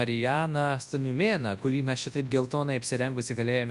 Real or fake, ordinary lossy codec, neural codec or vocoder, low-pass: fake; Opus, 64 kbps; codec, 24 kHz, 0.9 kbps, WavTokenizer, large speech release; 10.8 kHz